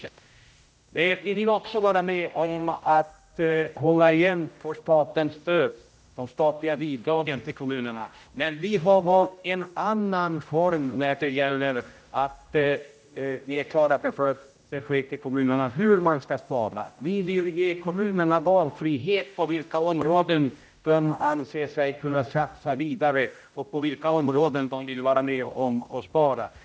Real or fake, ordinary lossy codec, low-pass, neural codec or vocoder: fake; none; none; codec, 16 kHz, 0.5 kbps, X-Codec, HuBERT features, trained on general audio